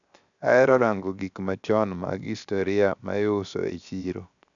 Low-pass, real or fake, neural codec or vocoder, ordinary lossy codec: 7.2 kHz; fake; codec, 16 kHz, 0.7 kbps, FocalCodec; none